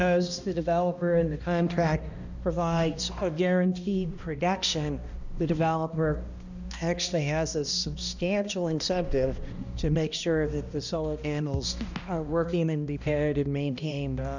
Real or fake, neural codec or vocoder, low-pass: fake; codec, 16 kHz, 1 kbps, X-Codec, HuBERT features, trained on balanced general audio; 7.2 kHz